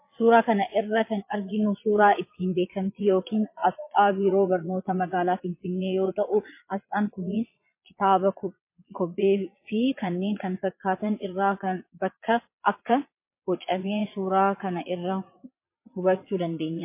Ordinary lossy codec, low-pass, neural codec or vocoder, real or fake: MP3, 24 kbps; 3.6 kHz; vocoder, 22.05 kHz, 80 mel bands, Vocos; fake